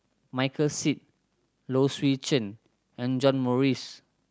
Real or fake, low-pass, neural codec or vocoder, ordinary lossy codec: real; none; none; none